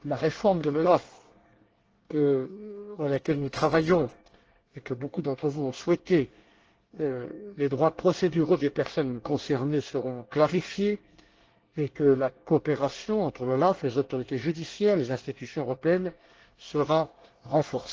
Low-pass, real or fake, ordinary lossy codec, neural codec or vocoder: 7.2 kHz; fake; Opus, 16 kbps; codec, 24 kHz, 1 kbps, SNAC